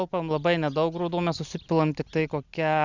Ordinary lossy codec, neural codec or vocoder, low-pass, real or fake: Opus, 64 kbps; none; 7.2 kHz; real